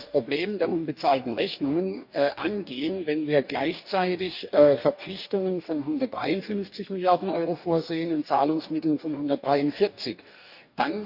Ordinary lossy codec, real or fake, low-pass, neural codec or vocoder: none; fake; 5.4 kHz; codec, 44.1 kHz, 2.6 kbps, DAC